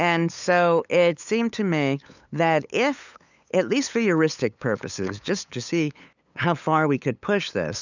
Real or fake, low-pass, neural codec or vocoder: fake; 7.2 kHz; codec, 16 kHz, 8 kbps, FunCodec, trained on LibriTTS, 25 frames a second